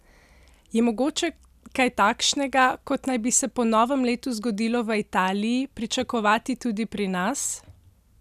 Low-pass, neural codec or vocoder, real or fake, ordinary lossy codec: 14.4 kHz; none; real; none